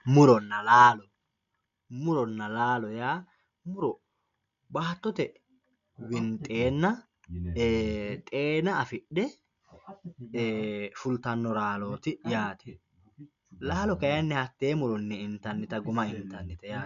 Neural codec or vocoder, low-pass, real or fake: none; 7.2 kHz; real